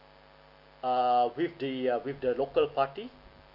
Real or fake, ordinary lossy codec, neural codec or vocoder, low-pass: real; none; none; 5.4 kHz